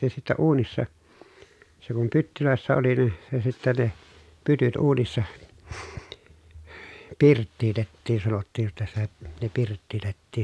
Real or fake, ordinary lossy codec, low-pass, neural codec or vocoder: real; none; none; none